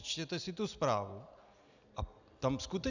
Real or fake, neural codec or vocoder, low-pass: real; none; 7.2 kHz